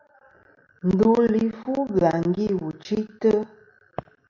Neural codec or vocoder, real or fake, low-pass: none; real; 7.2 kHz